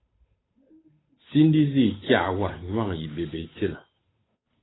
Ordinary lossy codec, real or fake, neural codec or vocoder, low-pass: AAC, 16 kbps; fake; codec, 16 kHz, 8 kbps, FunCodec, trained on Chinese and English, 25 frames a second; 7.2 kHz